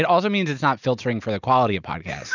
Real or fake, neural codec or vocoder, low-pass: real; none; 7.2 kHz